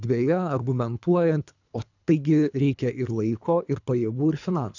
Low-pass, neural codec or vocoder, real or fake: 7.2 kHz; codec, 24 kHz, 3 kbps, HILCodec; fake